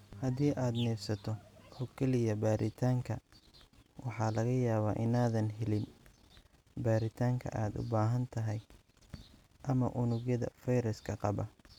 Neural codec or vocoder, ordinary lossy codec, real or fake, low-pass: none; none; real; 19.8 kHz